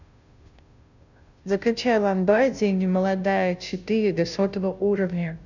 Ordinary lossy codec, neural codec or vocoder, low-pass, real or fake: none; codec, 16 kHz, 0.5 kbps, FunCodec, trained on Chinese and English, 25 frames a second; 7.2 kHz; fake